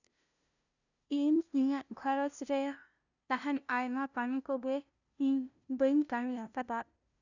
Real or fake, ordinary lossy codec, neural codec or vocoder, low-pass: fake; none; codec, 16 kHz, 0.5 kbps, FunCodec, trained on LibriTTS, 25 frames a second; 7.2 kHz